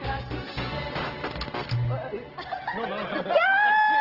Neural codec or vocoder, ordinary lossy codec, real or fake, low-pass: none; Opus, 16 kbps; real; 5.4 kHz